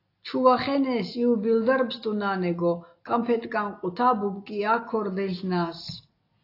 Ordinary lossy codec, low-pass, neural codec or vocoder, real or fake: AAC, 32 kbps; 5.4 kHz; none; real